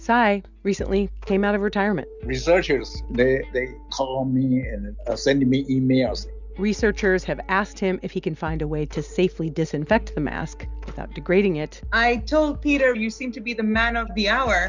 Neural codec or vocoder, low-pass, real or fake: none; 7.2 kHz; real